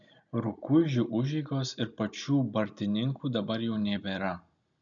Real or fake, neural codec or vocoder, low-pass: real; none; 7.2 kHz